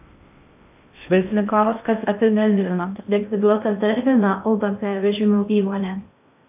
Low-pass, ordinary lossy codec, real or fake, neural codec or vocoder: 3.6 kHz; AAC, 32 kbps; fake; codec, 16 kHz in and 24 kHz out, 0.6 kbps, FocalCodec, streaming, 2048 codes